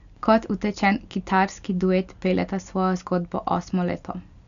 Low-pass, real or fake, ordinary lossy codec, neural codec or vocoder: 7.2 kHz; real; none; none